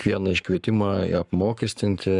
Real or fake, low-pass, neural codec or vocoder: fake; 10.8 kHz; codec, 44.1 kHz, 7.8 kbps, Pupu-Codec